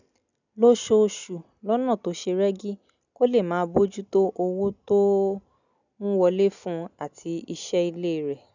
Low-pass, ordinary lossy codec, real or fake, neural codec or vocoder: 7.2 kHz; none; real; none